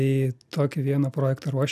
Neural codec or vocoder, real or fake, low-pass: none; real; 14.4 kHz